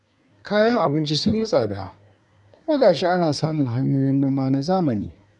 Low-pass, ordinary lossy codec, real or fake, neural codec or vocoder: 10.8 kHz; none; fake; codec, 24 kHz, 1 kbps, SNAC